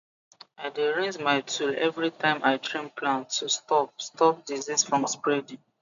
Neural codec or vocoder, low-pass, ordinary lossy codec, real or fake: none; 7.2 kHz; MP3, 96 kbps; real